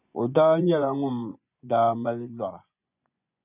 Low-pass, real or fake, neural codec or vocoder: 3.6 kHz; fake; vocoder, 24 kHz, 100 mel bands, Vocos